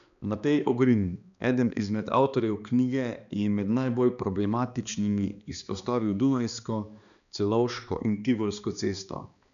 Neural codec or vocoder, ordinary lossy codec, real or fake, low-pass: codec, 16 kHz, 2 kbps, X-Codec, HuBERT features, trained on balanced general audio; none; fake; 7.2 kHz